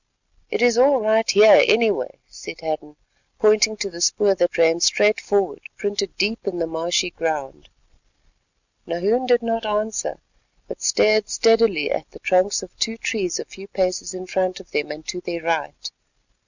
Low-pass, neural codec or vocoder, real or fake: 7.2 kHz; none; real